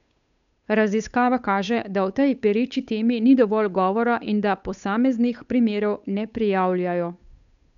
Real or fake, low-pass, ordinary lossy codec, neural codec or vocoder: fake; 7.2 kHz; none; codec, 16 kHz, 8 kbps, FunCodec, trained on Chinese and English, 25 frames a second